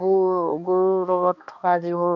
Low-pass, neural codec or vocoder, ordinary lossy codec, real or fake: 7.2 kHz; codec, 16 kHz, 2 kbps, X-Codec, HuBERT features, trained on balanced general audio; MP3, 64 kbps; fake